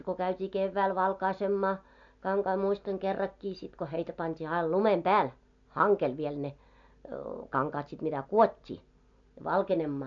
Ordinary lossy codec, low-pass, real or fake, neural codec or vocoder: AAC, 64 kbps; 7.2 kHz; real; none